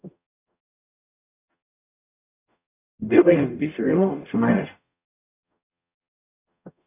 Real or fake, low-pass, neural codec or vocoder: fake; 3.6 kHz; codec, 44.1 kHz, 0.9 kbps, DAC